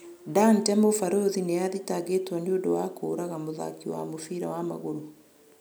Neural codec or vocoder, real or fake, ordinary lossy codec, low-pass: none; real; none; none